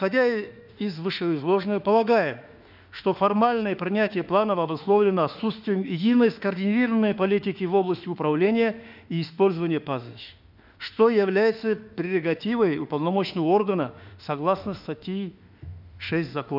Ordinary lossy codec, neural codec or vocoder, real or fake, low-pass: none; autoencoder, 48 kHz, 32 numbers a frame, DAC-VAE, trained on Japanese speech; fake; 5.4 kHz